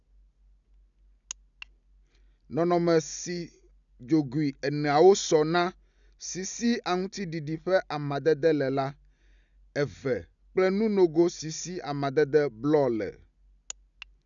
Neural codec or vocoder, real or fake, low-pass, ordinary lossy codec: none; real; 7.2 kHz; none